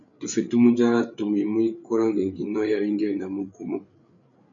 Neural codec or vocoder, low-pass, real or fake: codec, 16 kHz, 8 kbps, FreqCodec, larger model; 7.2 kHz; fake